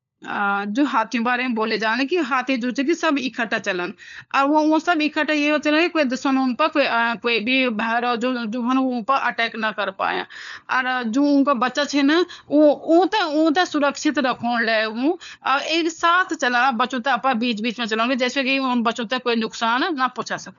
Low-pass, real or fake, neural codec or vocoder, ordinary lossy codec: 7.2 kHz; fake; codec, 16 kHz, 4 kbps, FunCodec, trained on LibriTTS, 50 frames a second; none